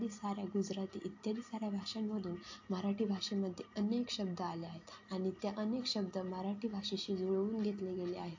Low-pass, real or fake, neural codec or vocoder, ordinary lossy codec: 7.2 kHz; real; none; AAC, 48 kbps